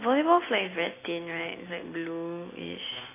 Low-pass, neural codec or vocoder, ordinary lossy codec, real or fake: 3.6 kHz; none; AAC, 16 kbps; real